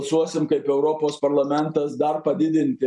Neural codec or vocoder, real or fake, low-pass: none; real; 10.8 kHz